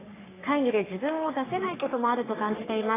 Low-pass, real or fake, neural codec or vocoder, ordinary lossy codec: 3.6 kHz; fake; codec, 44.1 kHz, 3.4 kbps, Pupu-Codec; AAC, 16 kbps